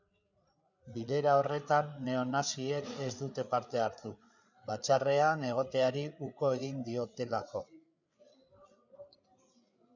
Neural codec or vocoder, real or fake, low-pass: codec, 16 kHz, 8 kbps, FreqCodec, larger model; fake; 7.2 kHz